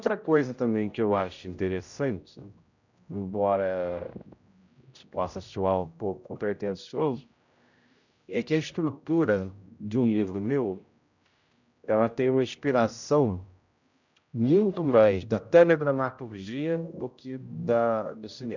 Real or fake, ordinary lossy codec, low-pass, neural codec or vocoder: fake; none; 7.2 kHz; codec, 16 kHz, 0.5 kbps, X-Codec, HuBERT features, trained on general audio